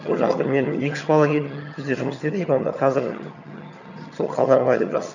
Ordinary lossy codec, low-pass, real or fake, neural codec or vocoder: none; 7.2 kHz; fake; vocoder, 22.05 kHz, 80 mel bands, HiFi-GAN